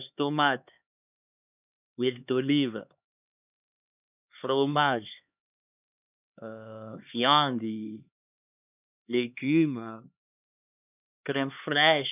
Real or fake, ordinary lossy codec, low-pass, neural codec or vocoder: fake; none; 3.6 kHz; codec, 16 kHz, 2 kbps, X-Codec, HuBERT features, trained on LibriSpeech